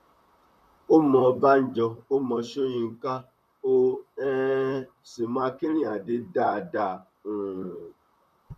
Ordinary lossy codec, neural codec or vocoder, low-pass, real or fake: none; vocoder, 44.1 kHz, 128 mel bands, Pupu-Vocoder; 14.4 kHz; fake